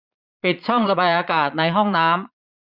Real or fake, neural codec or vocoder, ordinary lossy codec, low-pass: fake; vocoder, 22.05 kHz, 80 mel bands, Vocos; none; 5.4 kHz